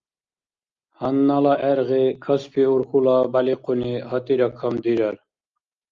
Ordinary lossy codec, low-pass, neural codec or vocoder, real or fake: Opus, 32 kbps; 7.2 kHz; none; real